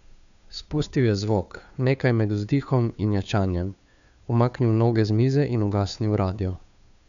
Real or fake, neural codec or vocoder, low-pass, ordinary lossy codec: fake; codec, 16 kHz, 2 kbps, FunCodec, trained on Chinese and English, 25 frames a second; 7.2 kHz; none